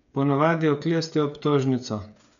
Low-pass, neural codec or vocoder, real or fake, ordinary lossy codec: 7.2 kHz; codec, 16 kHz, 8 kbps, FreqCodec, smaller model; fake; none